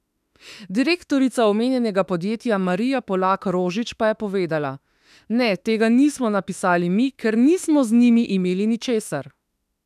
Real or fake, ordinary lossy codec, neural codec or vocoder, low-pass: fake; none; autoencoder, 48 kHz, 32 numbers a frame, DAC-VAE, trained on Japanese speech; 14.4 kHz